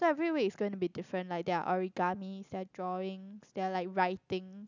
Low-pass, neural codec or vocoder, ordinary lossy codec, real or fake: 7.2 kHz; none; none; real